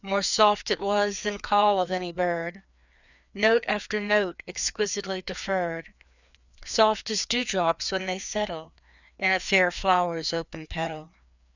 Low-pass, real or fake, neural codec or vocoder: 7.2 kHz; fake; codec, 16 kHz, 2 kbps, FreqCodec, larger model